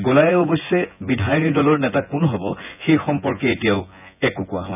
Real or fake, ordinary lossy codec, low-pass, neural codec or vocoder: fake; none; 3.6 kHz; vocoder, 24 kHz, 100 mel bands, Vocos